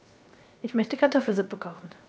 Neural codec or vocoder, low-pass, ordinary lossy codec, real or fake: codec, 16 kHz, 0.7 kbps, FocalCodec; none; none; fake